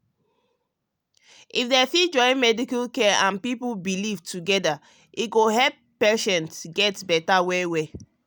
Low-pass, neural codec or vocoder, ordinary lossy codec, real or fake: none; none; none; real